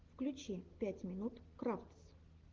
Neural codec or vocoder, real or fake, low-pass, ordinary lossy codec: none; real; 7.2 kHz; Opus, 16 kbps